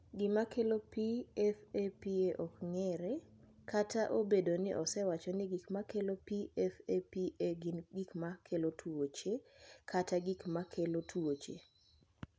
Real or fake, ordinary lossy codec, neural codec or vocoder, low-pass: real; none; none; none